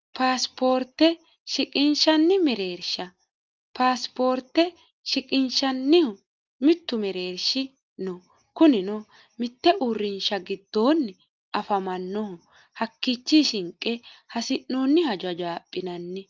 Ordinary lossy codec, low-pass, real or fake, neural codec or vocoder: Opus, 32 kbps; 7.2 kHz; real; none